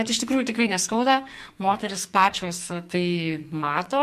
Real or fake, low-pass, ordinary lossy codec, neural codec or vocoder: fake; 14.4 kHz; MP3, 64 kbps; codec, 44.1 kHz, 2.6 kbps, SNAC